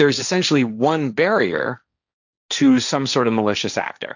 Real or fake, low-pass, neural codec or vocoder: fake; 7.2 kHz; codec, 16 kHz, 1.1 kbps, Voila-Tokenizer